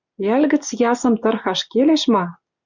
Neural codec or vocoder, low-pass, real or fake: none; 7.2 kHz; real